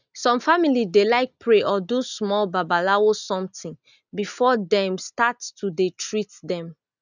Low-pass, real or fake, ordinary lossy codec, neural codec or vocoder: 7.2 kHz; real; none; none